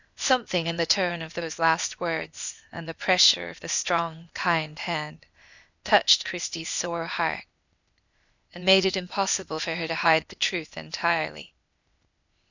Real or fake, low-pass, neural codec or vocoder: fake; 7.2 kHz; codec, 16 kHz, 0.8 kbps, ZipCodec